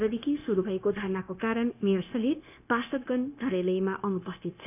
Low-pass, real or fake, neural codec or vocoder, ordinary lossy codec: 3.6 kHz; fake; codec, 16 kHz, 0.9 kbps, LongCat-Audio-Codec; none